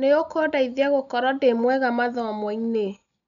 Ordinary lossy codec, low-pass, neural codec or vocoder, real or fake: none; 7.2 kHz; none; real